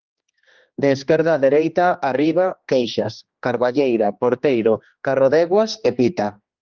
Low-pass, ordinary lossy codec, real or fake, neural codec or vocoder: 7.2 kHz; Opus, 32 kbps; fake; codec, 16 kHz, 2 kbps, X-Codec, HuBERT features, trained on general audio